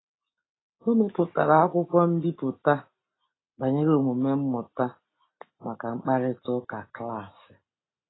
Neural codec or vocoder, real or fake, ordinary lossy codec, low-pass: none; real; AAC, 16 kbps; 7.2 kHz